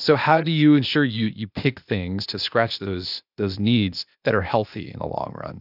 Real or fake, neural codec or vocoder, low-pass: fake; codec, 16 kHz, 0.8 kbps, ZipCodec; 5.4 kHz